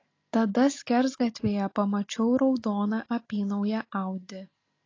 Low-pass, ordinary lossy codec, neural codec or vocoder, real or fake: 7.2 kHz; AAC, 32 kbps; none; real